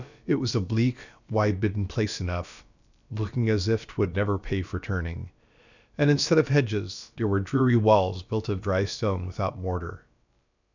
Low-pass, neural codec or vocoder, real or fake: 7.2 kHz; codec, 16 kHz, about 1 kbps, DyCAST, with the encoder's durations; fake